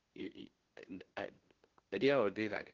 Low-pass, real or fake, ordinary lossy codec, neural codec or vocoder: 7.2 kHz; fake; Opus, 16 kbps; codec, 16 kHz, 0.5 kbps, FunCodec, trained on LibriTTS, 25 frames a second